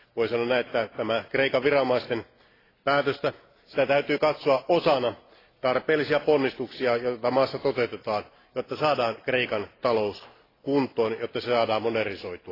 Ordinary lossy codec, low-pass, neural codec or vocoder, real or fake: AAC, 24 kbps; 5.4 kHz; none; real